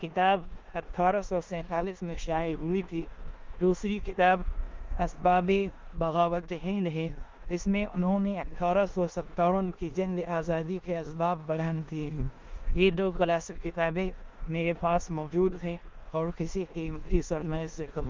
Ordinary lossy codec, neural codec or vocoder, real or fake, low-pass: Opus, 24 kbps; codec, 16 kHz in and 24 kHz out, 0.9 kbps, LongCat-Audio-Codec, four codebook decoder; fake; 7.2 kHz